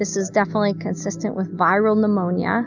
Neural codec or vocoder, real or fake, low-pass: none; real; 7.2 kHz